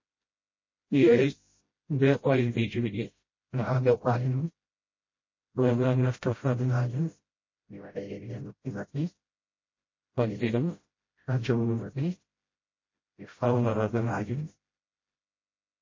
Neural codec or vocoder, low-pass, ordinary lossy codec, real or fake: codec, 16 kHz, 0.5 kbps, FreqCodec, smaller model; 7.2 kHz; MP3, 32 kbps; fake